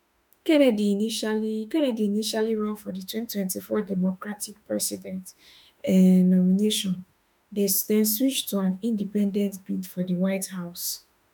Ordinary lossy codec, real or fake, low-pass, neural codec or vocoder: none; fake; 19.8 kHz; autoencoder, 48 kHz, 32 numbers a frame, DAC-VAE, trained on Japanese speech